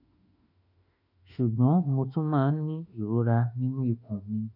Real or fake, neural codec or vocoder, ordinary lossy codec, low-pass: fake; autoencoder, 48 kHz, 32 numbers a frame, DAC-VAE, trained on Japanese speech; none; 5.4 kHz